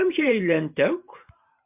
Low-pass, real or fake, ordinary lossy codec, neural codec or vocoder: 3.6 kHz; fake; MP3, 32 kbps; vocoder, 44.1 kHz, 128 mel bands every 512 samples, BigVGAN v2